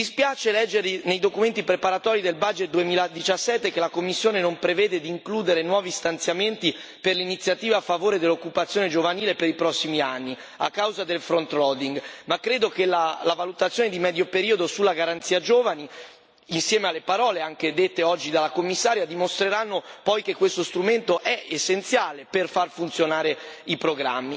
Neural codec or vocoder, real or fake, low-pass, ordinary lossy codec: none; real; none; none